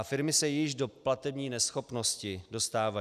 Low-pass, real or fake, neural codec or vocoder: 14.4 kHz; real; none